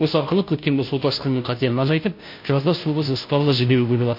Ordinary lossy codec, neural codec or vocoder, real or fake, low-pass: MP3, 32 kbps; codec, 16 kHz, 0.5 kbps, FunCodec, trained on Chinese and English, 25 frames a second; fake; 5.4 kHz